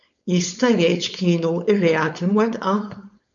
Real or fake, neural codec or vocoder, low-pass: fake; codec, 16 kHz, 4.8 kbps, FACodec; 7.2 kHz